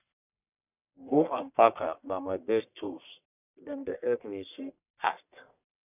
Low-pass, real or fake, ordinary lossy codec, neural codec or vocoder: 3.6 kHz; fake; none; codec, 44.1 kHz, 1.7 kbps, Pupu-Codec